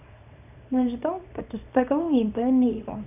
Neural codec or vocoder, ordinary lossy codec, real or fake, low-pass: codec, 24 kHz, 0.9 kbps, WavTokenizer, small release; none; fake; 3.6 kHz